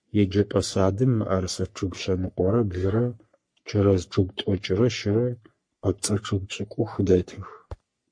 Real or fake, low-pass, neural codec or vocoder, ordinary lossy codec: fake; 9.9 kHz; codec, 44.1 kHz, 3.4 kbps, Pupu-Codec; MP3, 48 kbps